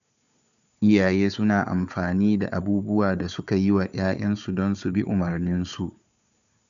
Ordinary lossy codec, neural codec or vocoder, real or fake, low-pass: none; codec, 16 kHz, 4 kbps, FunCodec, trained on Chinese and English, 50 frames a second; fake; 7.2 kHz